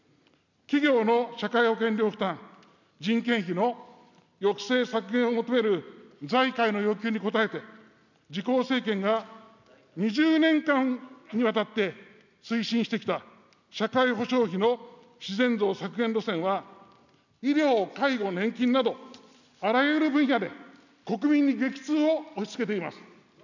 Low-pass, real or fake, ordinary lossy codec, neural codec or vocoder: 7.2 kHz; real; none; none